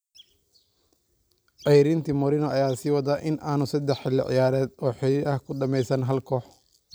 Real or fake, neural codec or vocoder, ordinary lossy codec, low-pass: real; none; none; none